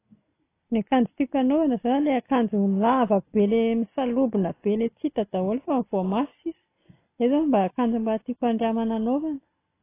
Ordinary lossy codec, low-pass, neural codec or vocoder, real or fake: AAC, 24 kbps; 3.6 kHz; none; real